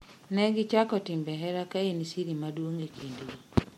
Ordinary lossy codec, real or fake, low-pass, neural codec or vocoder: MP3, 64 kbps; real; 19.8 kHz; none